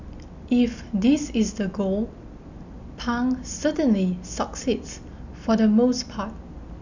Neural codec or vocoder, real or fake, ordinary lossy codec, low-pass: none; real; none; 7.2 kHz